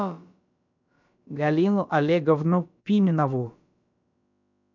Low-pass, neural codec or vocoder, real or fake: 7.2 kHz; codec, 16 kHz, about 1 kbps, DyCAST, with the encoder's durations; fake